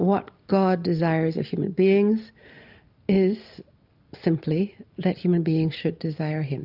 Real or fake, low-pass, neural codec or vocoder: real; 5.4 kHz; none